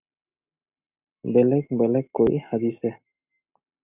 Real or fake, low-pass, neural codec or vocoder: real; 3.6 kHz; none